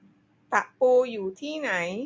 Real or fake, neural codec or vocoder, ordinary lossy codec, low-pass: real; none; none; none